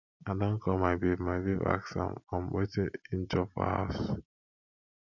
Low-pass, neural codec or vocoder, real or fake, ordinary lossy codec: 7.2 kHz; none; real; none